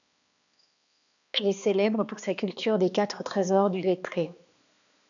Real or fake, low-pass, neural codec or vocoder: fake; 7.2 kHz; codec, 16 kHz, 2 kbps, X-Codec, HuBERT features, trained on balanced general audio